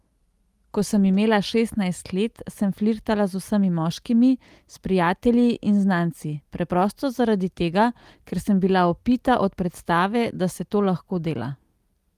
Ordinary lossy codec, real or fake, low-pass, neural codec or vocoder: Opus, 32 kbps; real; 14.4 kHz; none